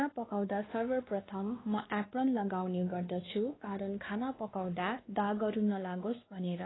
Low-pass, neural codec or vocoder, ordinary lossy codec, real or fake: 7.2 kHz; codec, 16 kHz, 2 kbps, X-Codec, WavLM features, trained on Multilingual LibriSpeech; AAC, 16 kbps; fake